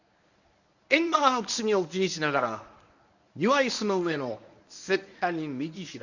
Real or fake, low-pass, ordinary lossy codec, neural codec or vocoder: fake; 7.2 kHz; none; codec, 24 kHz, 0.9 kbps, WavTokenizer, medium speech release version 1